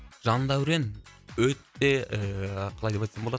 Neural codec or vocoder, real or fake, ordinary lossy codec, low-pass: none; real; none; none